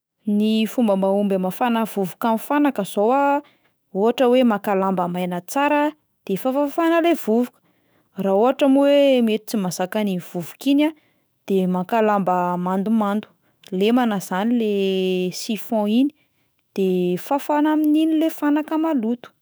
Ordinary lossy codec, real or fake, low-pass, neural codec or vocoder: none; fake; none; autoencoder, 48 kHz, 128 numbers a frame, DAC-VAE, trained on Japanese speech